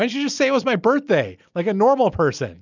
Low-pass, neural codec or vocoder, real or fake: 7.2 kHz; none; real